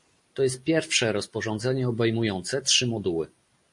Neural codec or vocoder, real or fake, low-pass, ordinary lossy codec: none; real; 10.8 kHz; MP3, 48 kbps